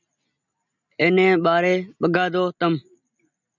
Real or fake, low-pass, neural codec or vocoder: real; 7.2 kHz; none